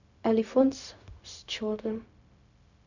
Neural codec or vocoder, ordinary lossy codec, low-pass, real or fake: codec, 16 kHz, 0.4 kbps, LongCat-Audio-Codec; none; 7.2 kHz; fake